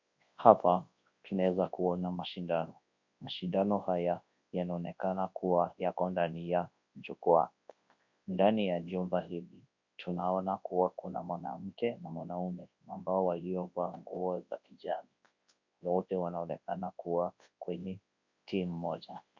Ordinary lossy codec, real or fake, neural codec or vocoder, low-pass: MP3, 48 kbps; fake; codec, 24 kHz, 0.9 kbps, WavTokenizer, large speech release; 7.2 kHz